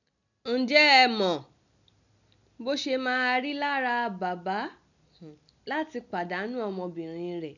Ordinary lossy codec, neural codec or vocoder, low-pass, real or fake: none; none; 7.2 kHz; real